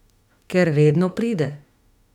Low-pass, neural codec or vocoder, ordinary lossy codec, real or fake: 19.8 kHz; autoencoder, 48 kHz, 32 numbers a frame, DAC-VAE, trained on Japanese speech; none; fake